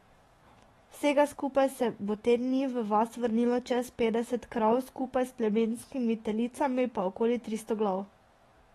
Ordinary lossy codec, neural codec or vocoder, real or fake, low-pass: AAC, 32 kbps; autoencoder, 48 kHz, 128 numbers a frame, DAC-VAE, trained on Japanese speech; fake; 19.8 kHz